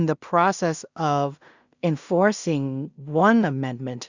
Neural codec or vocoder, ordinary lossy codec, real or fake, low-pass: codec, 16 kHz in and 24 kHz out, 0.4 kbps, LongCat-Audio-Codec, two codebook decoder; Opus, 64 kbps; fake; 7.2 kHz